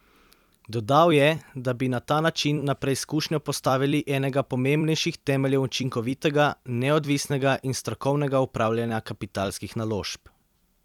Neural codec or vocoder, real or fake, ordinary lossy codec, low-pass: vocoder, 44.1 kHz, 128 mel bands every 256 samples, BigVGAN v2; fake; none; 19.8 kHz